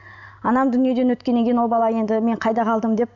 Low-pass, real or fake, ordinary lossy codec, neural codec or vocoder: 7.2 kHz; real; none; none